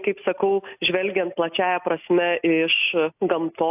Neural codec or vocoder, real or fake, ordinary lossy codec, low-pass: none; real; AAC, 32 kbps; 3.6 kHz